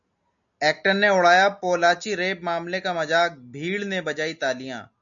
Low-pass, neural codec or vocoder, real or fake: 7.2 kHz; none; real